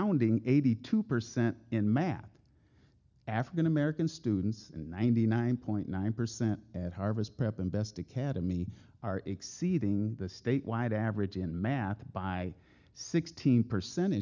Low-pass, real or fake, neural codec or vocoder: 7.2 kHz; real; none